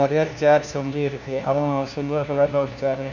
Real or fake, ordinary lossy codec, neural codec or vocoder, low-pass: fake; none; codec, 16 kHz, 1 kbps, FunCodec, trained on LibriTTS, 50 frames a second; 7.2 kHz